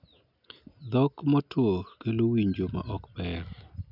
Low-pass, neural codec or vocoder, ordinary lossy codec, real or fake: 5.4 kHz; none; none; real